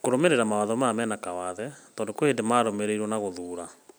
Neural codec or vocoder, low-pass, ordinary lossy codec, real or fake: none; none; none; real